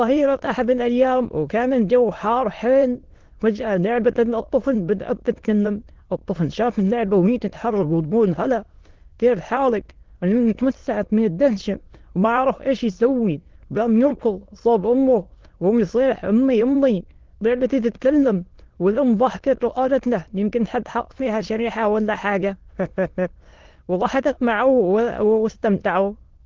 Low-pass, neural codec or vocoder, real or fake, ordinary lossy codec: 7.2 kHz; autoencoder, 22.05 kHz, a latent of 192 numbers a frame, VITS, trained on many speakers; fake; Opus, 16 kbps